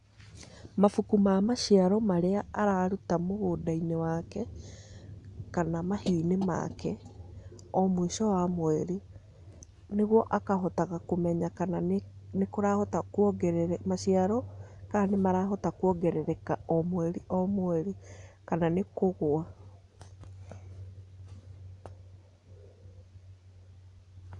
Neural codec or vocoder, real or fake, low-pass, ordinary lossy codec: none; real; 10.8 kHz; none